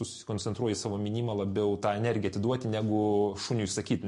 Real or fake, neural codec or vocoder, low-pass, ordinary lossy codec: real; none; 14.4 kHz; MP3, 48 kbps